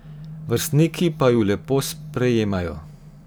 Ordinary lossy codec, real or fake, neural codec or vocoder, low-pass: none; fake; vocoder, 44.1 kHz, 128 mel bands every 512 samples, BigVGAN v2; none